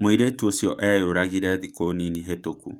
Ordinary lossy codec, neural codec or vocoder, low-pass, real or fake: none; codec, 44.1 kHz, 7.8 kbps, DAC; 19.8 kHz; fake